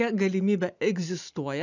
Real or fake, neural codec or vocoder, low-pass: real; none; 7.2 kHz